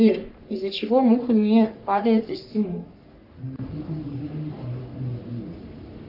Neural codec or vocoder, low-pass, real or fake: codec, 44.1 kHz, 3.4 kbps, Pupu-Codec; 5.4 kHz; fake